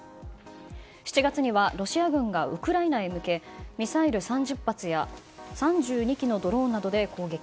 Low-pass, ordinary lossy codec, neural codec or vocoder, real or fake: none; none; none; real